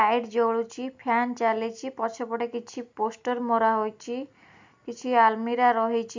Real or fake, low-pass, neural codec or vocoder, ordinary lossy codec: real; 7.2 kHz; none; MP3, 64 kbps